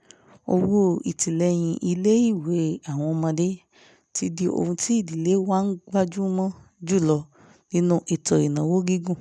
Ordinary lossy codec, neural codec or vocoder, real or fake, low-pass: none; none; real; none